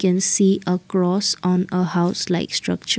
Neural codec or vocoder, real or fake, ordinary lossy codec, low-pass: none; real; none; none